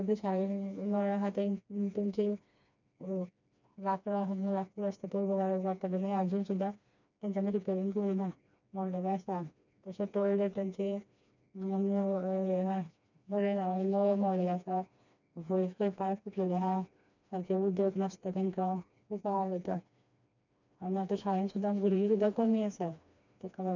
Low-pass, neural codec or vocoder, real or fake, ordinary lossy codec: 7.2 kHz; codec, 16 kHz, 2 kbps, FreqCodec, smaller model; fake; none